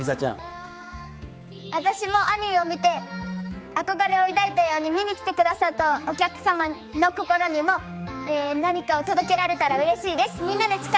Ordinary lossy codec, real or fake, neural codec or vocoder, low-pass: none; fake; codec, 16 kHz, 4 kbps, X-Codec, HuBERT features, trained on general audio; none